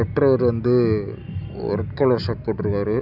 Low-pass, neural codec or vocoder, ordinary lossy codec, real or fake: 5.4 kHz; none; none; real